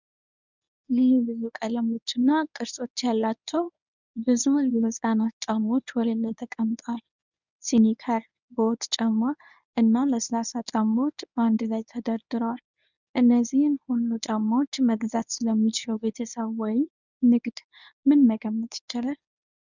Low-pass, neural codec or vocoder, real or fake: 7.2 kHz; codec, 24 kHz, 0.9 kbps, WavTokenizer, medium speech release version 1; fake